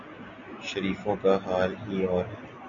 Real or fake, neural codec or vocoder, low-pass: real; none; 7.2 kHz